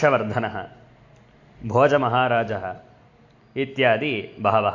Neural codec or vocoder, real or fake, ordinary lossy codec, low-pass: none; real; AAC, 48 kbps; 7.2 kHz